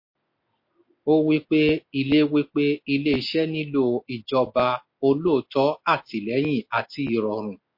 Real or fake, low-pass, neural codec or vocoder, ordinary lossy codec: real; 5.4 kHz; none; MP3, 32 kbps